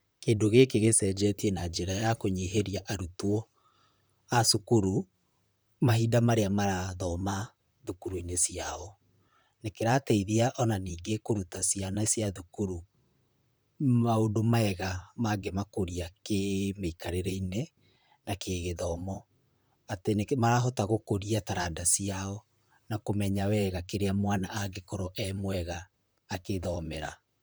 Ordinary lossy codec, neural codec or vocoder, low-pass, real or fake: none; vocoder, 44.1 kHz, 128 mel bands, Pupu-Vocoder; none; fake